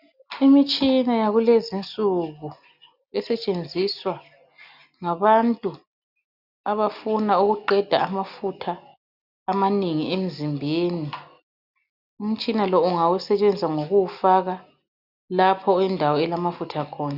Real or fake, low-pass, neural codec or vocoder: real; 5.4 kHz; none